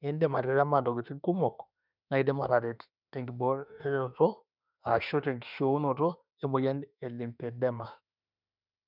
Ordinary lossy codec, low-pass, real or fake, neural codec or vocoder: none; 5.4 kHz; fake; autoencoder, 48 kHz, 32 numbers a frame, DAC-VAE, trained on Japanese speech